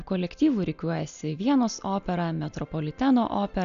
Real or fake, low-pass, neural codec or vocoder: real; 7.2 kHz; none